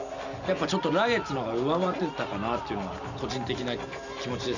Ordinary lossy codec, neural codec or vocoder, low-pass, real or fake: none; none; 7.2 kHz; real